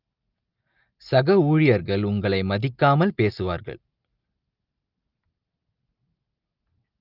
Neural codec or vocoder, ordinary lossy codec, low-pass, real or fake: none; Opus, 32 kbps; 5.4 kHz; real